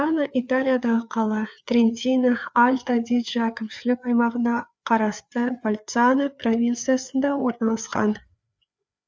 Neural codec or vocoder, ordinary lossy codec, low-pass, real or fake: codec, 16 kHz, 4 kbps, FreqCodec, larger model; none; none; fake